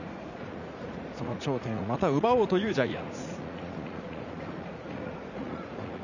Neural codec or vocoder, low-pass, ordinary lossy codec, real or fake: vocoder, 44.1 kHz, 80 mel bands, Vocos; 7.2 kHz; none; fake